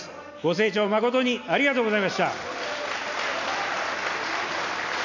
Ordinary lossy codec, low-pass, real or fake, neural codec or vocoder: none; 7.2 kHz; real; none